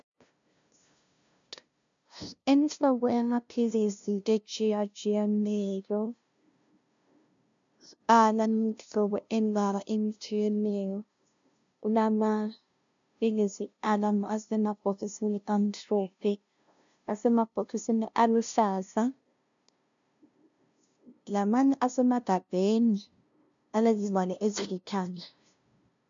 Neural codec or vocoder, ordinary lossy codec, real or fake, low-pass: codec, 16 kHz, 0.5 kbps, FunCodec, trained on LibriTTS, 25 frames a second; MP3, 64 kbps; fake; 7.2 kHz